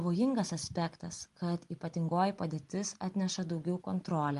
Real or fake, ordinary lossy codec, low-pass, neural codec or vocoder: real; Opus, 32 kbps; 10.8 kHz; none